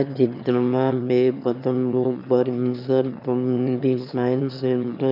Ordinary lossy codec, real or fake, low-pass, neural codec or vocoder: none; fake; 5.4 kHz; autoencoder, 22.05 kHz, a latent of 192 numbers a frame, VITS, trained on one speaker